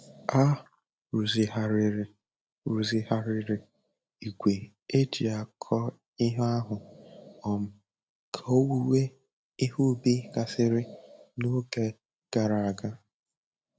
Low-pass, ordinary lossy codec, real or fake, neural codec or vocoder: none; none; real; none